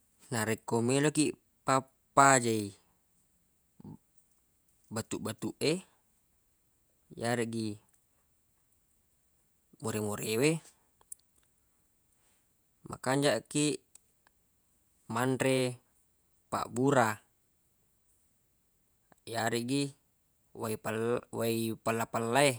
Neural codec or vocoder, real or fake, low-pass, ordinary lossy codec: vocoder, 48 kHz, 128 mel bands, Vocos; fake; none; none